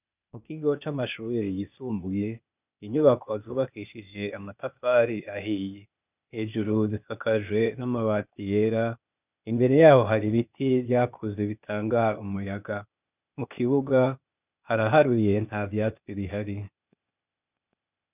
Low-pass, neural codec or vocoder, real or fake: 3.6 kHz; codec, 16 kHz, 0.8 kbps, ZipCodec; fake